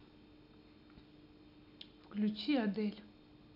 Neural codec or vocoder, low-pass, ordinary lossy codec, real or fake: none; 5.4 kHz; none; real